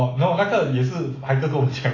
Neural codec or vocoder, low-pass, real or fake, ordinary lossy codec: none; 7.2 kHz; real; AAC, 32 kbps